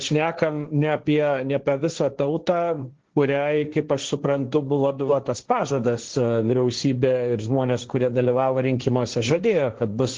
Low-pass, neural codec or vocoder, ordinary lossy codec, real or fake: 7.2 kHz; codec, 16 kHz, 1.1 kbps, Voila-Tokenizer; Opus, 16 kbps; fake